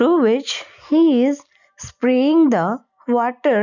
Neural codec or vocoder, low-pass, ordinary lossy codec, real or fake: none; 7.2 kHz; none; real